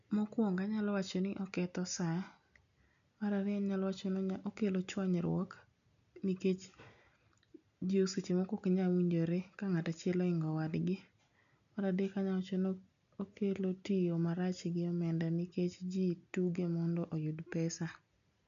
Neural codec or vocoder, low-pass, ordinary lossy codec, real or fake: none; 7.2 kHz; none; real